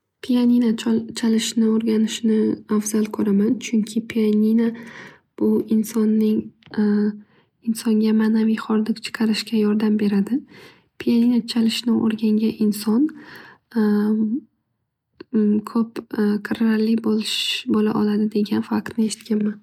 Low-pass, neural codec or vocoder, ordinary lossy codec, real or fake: 19.8 kHz; none; MP3, 96 kbps; real